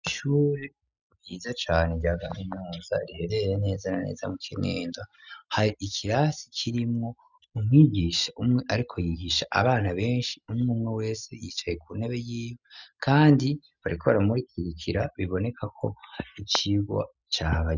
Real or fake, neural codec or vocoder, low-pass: real; none; 7.2 kHz